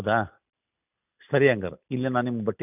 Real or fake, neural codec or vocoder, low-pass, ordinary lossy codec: real; none; 3.6 kHz; none